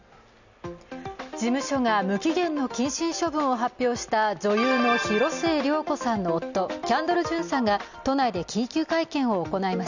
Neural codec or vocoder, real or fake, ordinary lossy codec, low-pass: none; real; none; 7.2 kHz